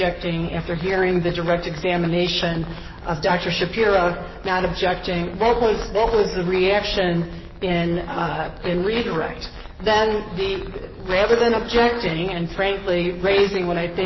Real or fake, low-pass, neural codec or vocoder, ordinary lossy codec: fake; 7.2 kHz; codec, 44.1 kHz, 7.8 kbps, DAC; MP3, 24 kbps